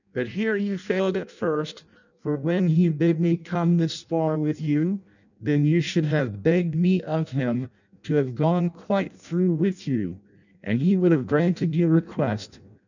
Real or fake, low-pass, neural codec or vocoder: fake; 7.2 kHz; codec, 16 kHz in and 24 kHz out, 0.6 kbps, FireRedTTS-2 codec